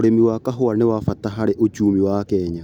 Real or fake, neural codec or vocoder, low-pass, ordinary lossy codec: real; none; 19.8 kHz; none